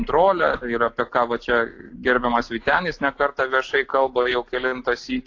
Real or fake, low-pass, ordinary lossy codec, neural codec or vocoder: real; 7.2 kHz; AAC, 48 kbps; none